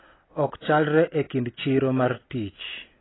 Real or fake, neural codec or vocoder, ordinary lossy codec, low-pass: real; none; AAC, 16 kbps; 7.2 kHz